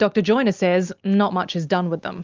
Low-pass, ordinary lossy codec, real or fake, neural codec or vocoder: 7.2 kHz; Opus, 32 kbps; real; none